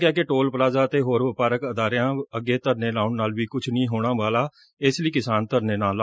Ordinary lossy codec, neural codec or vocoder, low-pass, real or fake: none; none; none; real